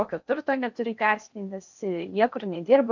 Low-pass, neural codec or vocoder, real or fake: 7.2 kHz; codec, 16 kHz in and 24 kHz out, 0.8 kbps, FocalCodec, streaming, 65536 codes; fake